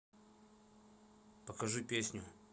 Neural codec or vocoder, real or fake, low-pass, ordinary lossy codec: none; real; none; none